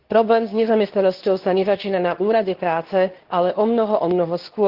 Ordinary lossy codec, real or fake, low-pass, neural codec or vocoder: Opus, 16 kbps; fake; 5.4 kHz; codec, 24 kHz, 0.9 kbps, WavTokenizer, medium speech release version 2